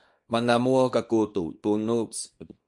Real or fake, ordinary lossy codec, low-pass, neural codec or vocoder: fake; MP3, 48 kbps; 10.8 kHz; codec, 24 kHz, 0.9 kbps, WavTokenizer, small release